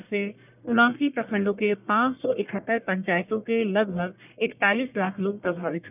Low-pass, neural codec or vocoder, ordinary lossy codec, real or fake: 3.6 kHz; codec, 44.1 kHz, 1.7 kbps, Pupu-Codec; none; fake